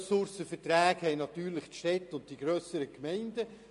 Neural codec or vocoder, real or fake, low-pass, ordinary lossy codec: none; real; 10.8 kHz; MP3, 48 kbps